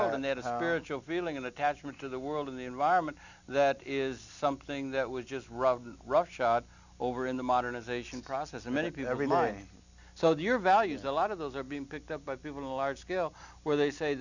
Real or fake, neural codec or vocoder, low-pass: real; none; 7.2 kHz